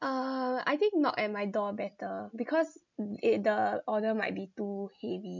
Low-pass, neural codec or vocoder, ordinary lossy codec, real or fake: 7.2 kHz; none; none; real